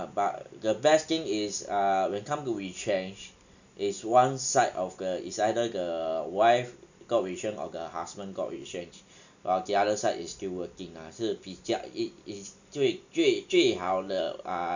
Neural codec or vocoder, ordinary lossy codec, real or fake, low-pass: none; none; real; 7.2 kHz